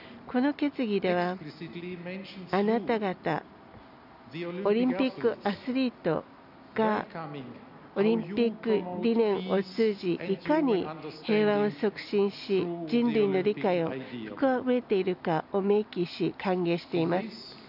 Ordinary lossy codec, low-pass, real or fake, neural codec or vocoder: none; 5.4 kHz; real; none